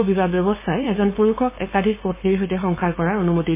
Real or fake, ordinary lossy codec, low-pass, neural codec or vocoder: fake; MP3, 16 kbps; 3.6 kHz; codec, 16 kHz, 4.8 kbps, FACodec